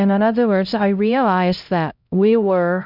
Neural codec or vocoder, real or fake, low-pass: codec, 16 kHz, 0.5 kbps, X-Codec, HuBERT features, trained on LibriSpeech; fake; 5.4 kHz